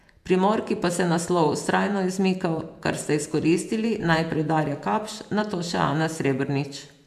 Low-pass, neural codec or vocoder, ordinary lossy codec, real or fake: 14.4 kHz; vocoder, 48 kHz, 128 mel bands, Vocos; MP3, 96 kbps; fake